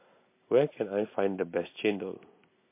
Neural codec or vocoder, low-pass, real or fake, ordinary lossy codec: none; 3.6 kHz; real; MP3, 24 kbps